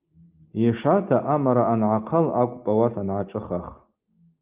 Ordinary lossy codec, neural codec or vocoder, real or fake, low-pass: Opus, 24 kbps; none; real; 3.6 kHz